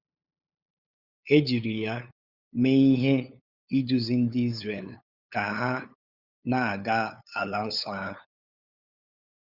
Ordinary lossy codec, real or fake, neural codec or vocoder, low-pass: Opus, 64 kbps; fake; codec, 16 kHz, 8 kbps, FunCodec, trained on LibriTTS, 25 frames a second; 5.4 kHz